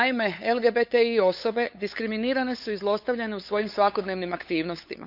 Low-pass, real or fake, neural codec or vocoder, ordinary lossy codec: 5.4 kHz; fake; codec, 16 kHz, 8 kbps, FunCodec, trained on LibriTTS, 25 frames a second; none